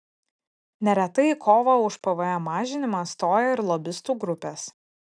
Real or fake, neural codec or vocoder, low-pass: real; none; 9.9 kHz